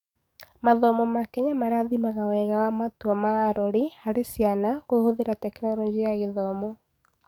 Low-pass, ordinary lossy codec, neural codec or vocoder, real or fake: 19.8 kHz; none; codec, 44.1 kHz, 7.8 kbps, DAC; fake